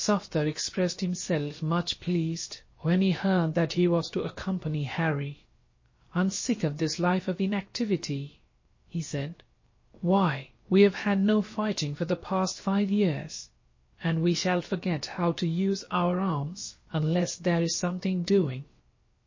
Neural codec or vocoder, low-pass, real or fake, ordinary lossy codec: codec, 16 kHz, about 1 kbps, DyCAST, with the encoder's durations; 7.2 kHz; fake; MP3, 32 kbps